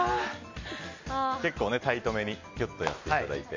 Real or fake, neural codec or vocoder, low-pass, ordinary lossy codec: real; none; 7.2 kHz; MP3, 48 kbps